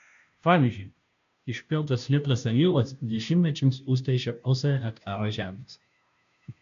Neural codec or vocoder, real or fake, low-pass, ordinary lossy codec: codec, 16 kHz, 0.5 kbps, FunCodec, trained on Chinese and English, 25 frames a second; fake; 7.2 kHz; MP3, 64 kbps